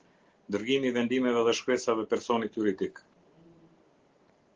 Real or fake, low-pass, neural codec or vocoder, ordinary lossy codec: real; 7.2 kHz; none; Opus, 16 kbps